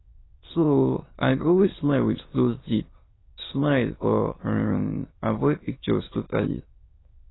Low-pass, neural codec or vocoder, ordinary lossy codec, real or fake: 7.2 kHz; autoencoder, 22.05 kHz, a latent of 192 numbers a frame, VITS, trained on many speakers; AAC, 16 kbps; fake